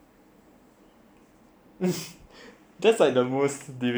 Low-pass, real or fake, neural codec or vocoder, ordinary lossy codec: none; fake; vocoder, 44.1 kHz, 128 mel bands every 512 samples, BigVGAN v2; none